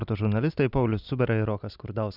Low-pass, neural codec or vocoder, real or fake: 5.4 kHz; none; real